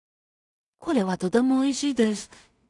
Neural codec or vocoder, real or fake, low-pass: codec, 16 kHz in and 24 kHz out, 0.4 kbps, LongCat-Audio-Codec, two codebook decoder; fake; 10.8 kHz